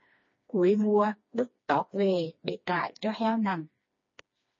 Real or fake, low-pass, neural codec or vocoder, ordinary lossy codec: fake; 7.2 kHz; codec, 16 kHz, 2 kbps, FreqCodec, smaller model; MP3, 32 kbps